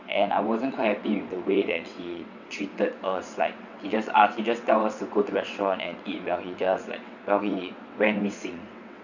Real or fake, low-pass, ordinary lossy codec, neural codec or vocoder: fake; 7.2 kHz; none; vocoder, 22.05 kHz, 80 mel bands, WaveNeXt